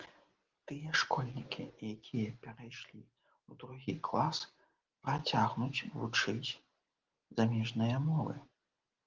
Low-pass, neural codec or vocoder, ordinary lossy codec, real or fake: 7.2 kHz; none; Opus, 16 kbps; real